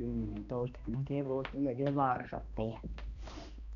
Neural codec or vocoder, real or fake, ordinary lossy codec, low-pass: codec, 16 kHz, 1 kbps, X-Codec, HuBERT features, trained on balanced general audio; fake; none; 7.2 kHz